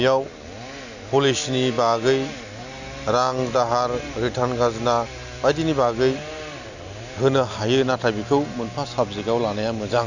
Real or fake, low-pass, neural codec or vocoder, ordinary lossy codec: real; 7.2 kHz; none; none